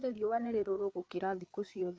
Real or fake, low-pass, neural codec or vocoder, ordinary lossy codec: fake; none; codec, 16 kHz, 4 kbps, FreqCodec, larger model; none